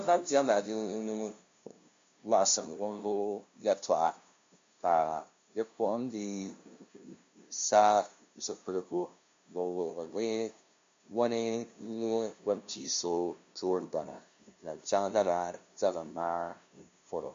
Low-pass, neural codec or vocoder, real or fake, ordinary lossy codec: 7.2 kHz; codec, 16 kHz, 0.5 kbps, FunCodec, trained on LibriTTS, 25 frames a second; fake; MP3, 48 kbps